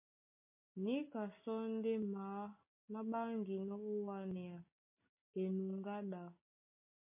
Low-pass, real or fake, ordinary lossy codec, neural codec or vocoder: 3.6 kHz; real; MP3, 32 kbps; none